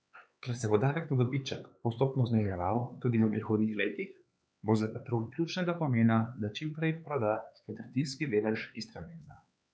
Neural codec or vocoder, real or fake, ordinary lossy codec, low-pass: codec, 16 kHz, 4 kbps, X-Codec, HuBERT features, trained on LibriSpeech; fake; none; none